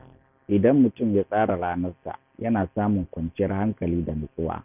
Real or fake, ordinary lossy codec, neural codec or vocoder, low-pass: real; none; none; 3.6 kHz